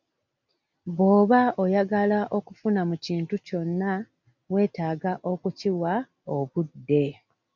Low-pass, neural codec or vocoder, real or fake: 7.2 kHz; none; real